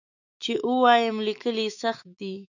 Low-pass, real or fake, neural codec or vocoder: 7.2 kHz; fake; autoencoder, 48 kHz, 128 numbers a frame, DAC-VAE, trained on Japanese speech